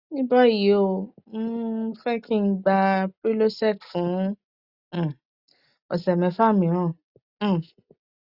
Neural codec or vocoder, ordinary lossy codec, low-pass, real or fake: none; Opus, 64 kbps; 5.4 kHz; real